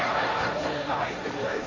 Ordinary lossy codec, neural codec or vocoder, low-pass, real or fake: AAC, 32 kbps; codec, 16 kHz, 1.1 kbps, Voila-Tokenizer; 7.2 kHz; fake